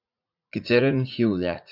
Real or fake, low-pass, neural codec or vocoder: fake; 5.4 kHz; vocoder, 44.1 kHz, 80 mel bands, Vocos